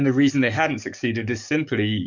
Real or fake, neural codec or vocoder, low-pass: fake; codec, 44.1 kHz, 7.8 kbps, DAC; 7.2 kHz